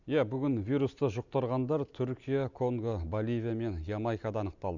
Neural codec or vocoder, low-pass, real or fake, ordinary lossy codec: none; 7.2 kHz; real; none